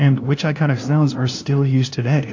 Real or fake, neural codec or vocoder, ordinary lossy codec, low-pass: fake; codec, 16 kHz, 0.5 kbps, FunCodec, trained on LibriTTS, 25 frames a second; AAC, 48 kbps; 7.2 kHz